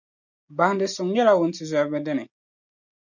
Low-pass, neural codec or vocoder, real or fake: 7.2 kHz; none; real